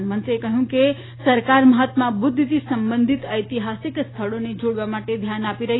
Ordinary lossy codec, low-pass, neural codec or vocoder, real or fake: AAC, 16 kbps; 7.2 kHz; none; real